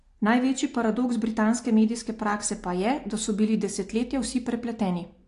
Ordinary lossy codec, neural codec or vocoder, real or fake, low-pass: AAC, 48 kbps; none; real; 10.8 kHz